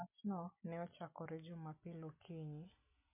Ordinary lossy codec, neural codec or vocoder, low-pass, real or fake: MP3, 32 kbps; none; 3.6 kHz; real